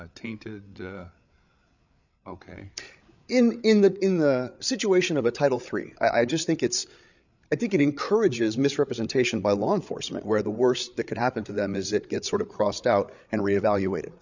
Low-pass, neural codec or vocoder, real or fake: 7.2 kHz; codec, 16 kHz, 8 kbps, FreqCodec, larger model; fake